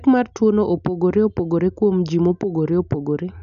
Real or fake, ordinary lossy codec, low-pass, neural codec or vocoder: real; none; 7.2 kHz; none